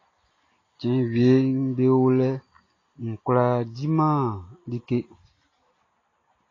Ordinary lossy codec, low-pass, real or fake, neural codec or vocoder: AAC, 32 kbps; 7.2 kHz; real; none